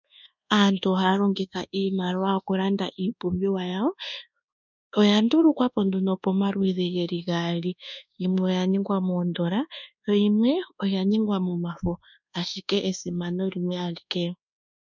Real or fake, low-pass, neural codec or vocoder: fake; 7.2 kHz; codec, 24 kHz, 1.2 kbps, DualCodec